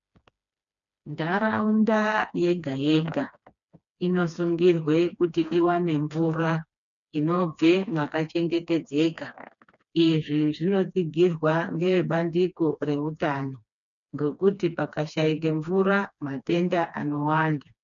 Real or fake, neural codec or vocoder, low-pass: fake; codec, 16 kHz, 2 kbps, FreqCodec, smaller model; 7.2 kHz